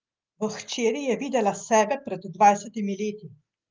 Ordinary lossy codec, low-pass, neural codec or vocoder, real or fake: Opus, 32 kbps; 7.2 kHz; none; real